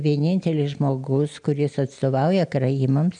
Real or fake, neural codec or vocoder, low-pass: real; none; 9.9 kHz